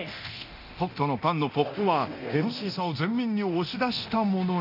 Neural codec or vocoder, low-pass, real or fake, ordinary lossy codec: codec, 24 kHz, 0.9 kbps, DualCodec; 5.4 kHz; fake; none